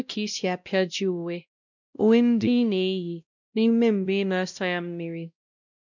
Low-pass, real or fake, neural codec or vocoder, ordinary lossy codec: 7.2 kHz; fake; codec, 16 kHz, 0.5 kbps, X-Codec, WavLM features, trained on Multilingual LibriSpeech; none